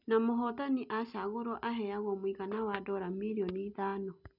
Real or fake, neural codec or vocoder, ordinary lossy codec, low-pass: real; none; none; 5.4 kHz